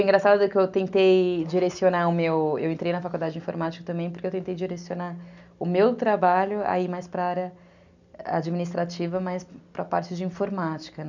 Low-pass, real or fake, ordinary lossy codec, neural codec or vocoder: 7.2 kHz; real; none; none